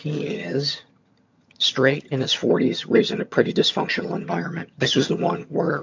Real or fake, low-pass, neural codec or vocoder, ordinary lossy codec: fake; 7.2 kHz; vocoder, 22.05 kHz, 80 mel bands, HiFi-GAN; MP3, 48 kbps